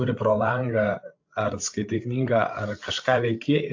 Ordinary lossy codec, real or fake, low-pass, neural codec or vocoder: AAC, 48 kbps; fake; 7.2 kHz; codec, 16 kHz, 8 kbps, FreqCodec, larger model